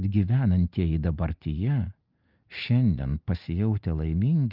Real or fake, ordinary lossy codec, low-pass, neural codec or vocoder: real; Opus, 24 kbps; 5.4 kHz; none